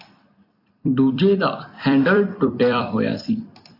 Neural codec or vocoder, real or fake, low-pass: vocoder, 44.1 kHz, 128 mel bands every 256 samples, BigVGAN v2; fake; 5.4 kHz